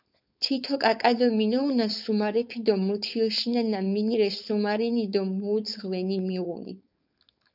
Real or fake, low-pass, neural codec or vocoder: fake; 5.4 kHz; codec, 16 kHz, 4.8 kbps, FACodec